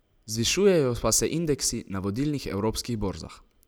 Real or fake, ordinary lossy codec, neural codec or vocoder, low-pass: real; none; none; none